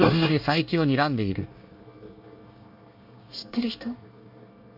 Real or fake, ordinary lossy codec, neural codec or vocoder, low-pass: fake; MP3, 48 kbps; codec, 24 kHz, 1 kbps, SNAC; 5.4 kHz